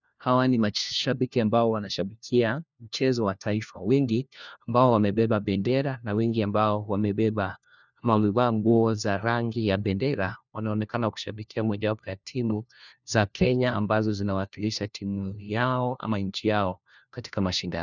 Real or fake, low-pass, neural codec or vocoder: fake; 7.2 kHz; codec, 16 kHz, 1 kbps, FunCodec, trained on LibriTTS, 50 frames a second